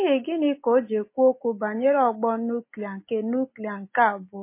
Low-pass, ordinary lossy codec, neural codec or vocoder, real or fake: 3.6 kHz; MP3, 24 kbps; none; real